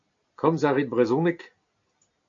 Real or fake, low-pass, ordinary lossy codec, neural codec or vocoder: real; 7.2 kHz; AAC, 48 kbps; none